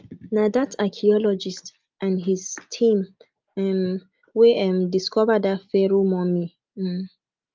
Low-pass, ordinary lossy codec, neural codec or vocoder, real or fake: 7.2 kHz; Opus, 24 kbps; none; real